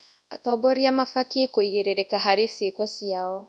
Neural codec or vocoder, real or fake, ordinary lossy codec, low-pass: codec, 24 kHz, 0.9 kbps, WavTokenizer, large speech release; fake; none; none